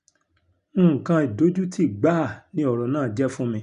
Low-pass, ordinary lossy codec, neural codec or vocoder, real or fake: 10.8 kHz; none; none; real